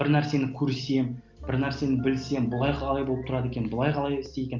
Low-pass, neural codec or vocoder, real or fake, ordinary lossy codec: 7.2 kHz; none; real; Opus, 32 kbps